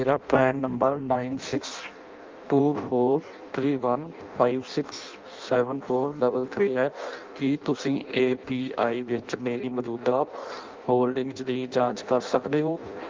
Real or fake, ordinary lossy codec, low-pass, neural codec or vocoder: fake; Opus, 24 kbps; 7.2 kHz; codec, 16 kHz in and 24 kHz out, 0.6 kbps, FireRedTTS-2 codec